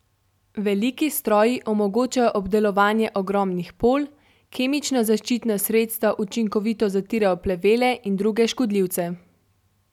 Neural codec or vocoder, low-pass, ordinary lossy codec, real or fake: none; 19.8 kHz; none; real